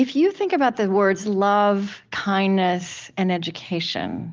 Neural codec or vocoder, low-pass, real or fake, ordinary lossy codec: none; 7.2 kHz; real; Opus, 32 kbps